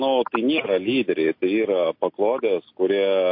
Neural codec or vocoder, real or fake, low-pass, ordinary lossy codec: none; real; 5.4 kHz; MP3, 32 kbps